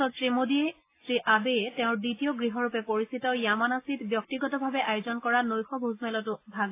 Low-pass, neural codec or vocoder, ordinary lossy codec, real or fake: 3.6 kHz; none; AAC, 24 kbps; real